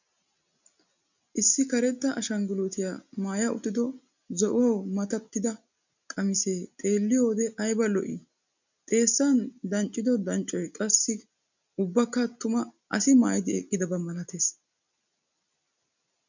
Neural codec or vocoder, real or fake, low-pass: none; real; 7.2 kHz